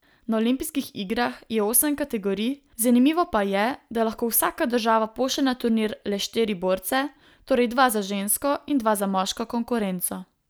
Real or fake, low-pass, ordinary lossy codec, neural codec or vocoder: real; none; none; none